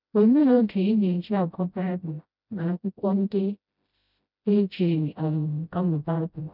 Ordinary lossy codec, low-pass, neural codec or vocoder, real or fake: none; 5.4 kHz; codec, 16 kHz, 0.5 kbps, FreqCodec, smaller model; fake